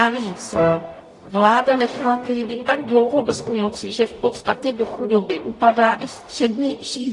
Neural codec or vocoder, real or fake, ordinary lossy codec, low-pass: codec, 44.1 kHz, 0.9 kbps, DAC; fake; AAC, 48 kbps; 10.8 kHz